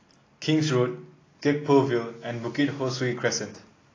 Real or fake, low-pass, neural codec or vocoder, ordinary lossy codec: real; 7.2 kHz; none; AAC, 32 kbps